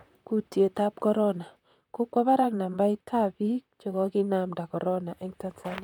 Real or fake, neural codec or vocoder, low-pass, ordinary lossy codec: fake; vocoder, 44.1 kHz, 128 mel bands, Pupu-Vocoder; 19.8 kHz; MP3, 96 kbps